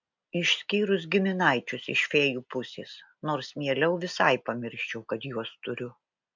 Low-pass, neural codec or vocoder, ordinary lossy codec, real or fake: 7.2 kHz; none; MP3, 64 kbps; real